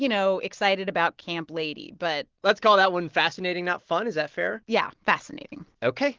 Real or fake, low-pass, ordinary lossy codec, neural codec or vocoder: real; 7.2 kHz; Opus, 16 kbps; none